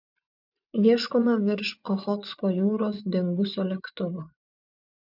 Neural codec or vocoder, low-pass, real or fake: vocoder, 24 kHz, 100 mel bands, Vocos; 5.4 kHz; fake